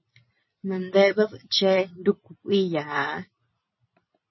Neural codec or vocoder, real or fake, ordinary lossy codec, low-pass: vocoder, 44.1 kHz, 128 mel bands every 256 samples, BigVGAN v2; fake; MP3, 24 kbps; 7.2 kHz